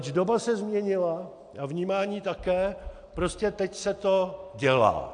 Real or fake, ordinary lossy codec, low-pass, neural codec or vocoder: real; AAC, 64 kbps; 9.9 kHz; none